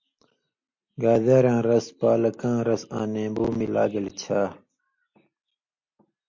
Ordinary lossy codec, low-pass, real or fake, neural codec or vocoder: MP3, 48 kbps; 7.2 kHz; real; none